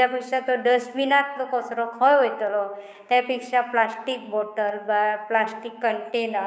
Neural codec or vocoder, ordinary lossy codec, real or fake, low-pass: codec, 16 kHz, 6 kbps, DAC; none; fake; none